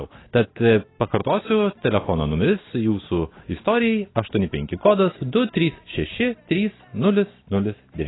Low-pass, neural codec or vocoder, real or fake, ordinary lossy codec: 7.2 kHz; none; real; AAC, 16 kbps